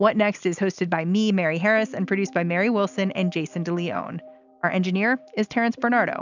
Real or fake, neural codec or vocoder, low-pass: real; none; 7.2 kHz